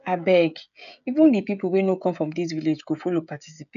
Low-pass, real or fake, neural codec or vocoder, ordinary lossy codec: 7.2 kHz; fake; codec, 16 kHz, 16 kbps, FreqCodec, smaller model; none